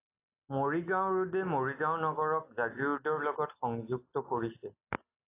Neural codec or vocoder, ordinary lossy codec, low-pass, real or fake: none; AAC, 24 kbps; 3.6 kHz; real